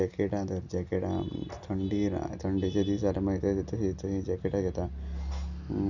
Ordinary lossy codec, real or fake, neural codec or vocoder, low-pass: none; real; none; 7.2 kHz